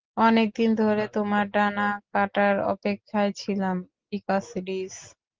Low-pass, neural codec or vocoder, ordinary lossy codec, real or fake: 7.2 kHz; none; Opus, 16 kbps; real